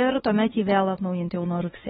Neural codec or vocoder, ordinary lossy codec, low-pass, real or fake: autoencoder, 48 kHz, 32 numbers a frame, DAC-VAE, trained on Japanese speech; AAC, 16 kbps; 19.8 kHz; fake